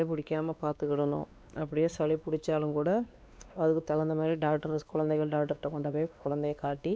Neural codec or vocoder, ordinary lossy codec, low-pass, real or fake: codec, 16 kHz, 2 kbps, X-Codec, WavLM features, trained on Multilingual LibriSpeech; none; none; fake